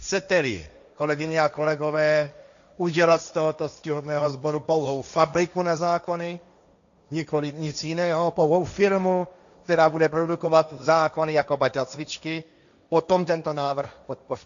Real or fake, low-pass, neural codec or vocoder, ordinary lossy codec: fake; 7.2 kHz; codec, 16 kHz, 1.1 kbps, Voila-Tokenizer; MP3, 96 kbps